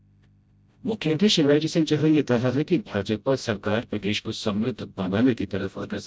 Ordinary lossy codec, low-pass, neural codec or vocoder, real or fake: none; none; codec, 16 kHz, 0.5 kbps, FreqCodec, smaller model; fake